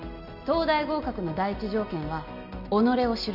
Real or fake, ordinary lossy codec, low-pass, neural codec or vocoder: real; none; 5.4 kHz; none